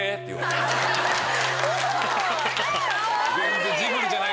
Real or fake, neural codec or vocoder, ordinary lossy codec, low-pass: real; none; none; none